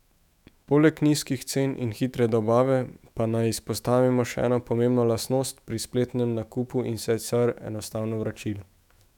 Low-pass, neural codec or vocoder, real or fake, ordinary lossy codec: 19.8 kHz; autoencoder, 48 kHz, 128 numbers a frame, DAC-VAE, trained on Japanese speech; fake; none